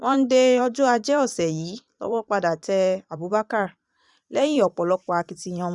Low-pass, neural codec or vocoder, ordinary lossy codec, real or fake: 10.8 kHz; vocoder, 44.1 kHz, 128 mel bands every 512 samples, BigVGAN v2; none; fake